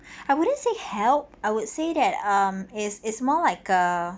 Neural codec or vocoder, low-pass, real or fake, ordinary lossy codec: none; none; real; none